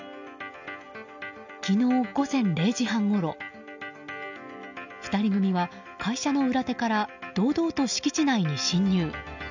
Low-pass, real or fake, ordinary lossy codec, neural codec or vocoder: 7.2 kHz; real; none; none